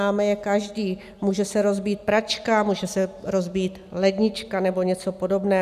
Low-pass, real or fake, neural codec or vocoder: 14.4 kHz; real; none